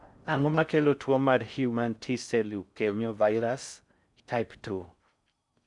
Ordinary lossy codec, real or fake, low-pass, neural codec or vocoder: none; fake; 10.8 kHz; codec, 16 kHz in and 24 kHz out, 0.6 kbps, FocalCodec, streaming, 4096 codes